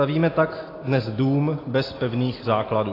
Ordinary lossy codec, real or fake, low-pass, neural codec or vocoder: AAC, 24 kbps; real; 5.4 kHz; none